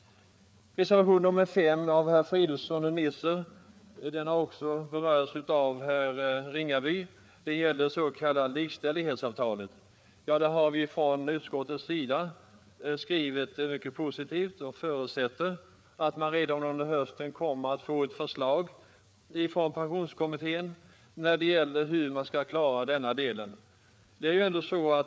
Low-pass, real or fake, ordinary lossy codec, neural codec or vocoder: none; fake; none; codec, 16 kHz, 4 kbps, FreqCodec, larger model